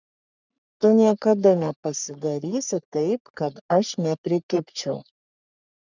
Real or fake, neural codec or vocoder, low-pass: fake; codec, 44.1 kHz, 3.4 kbps, Pupu-Codec; 7.2 kHz